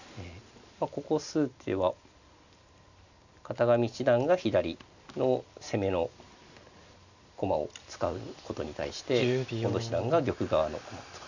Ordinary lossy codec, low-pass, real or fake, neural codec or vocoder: none; 7.2 kHz; real; none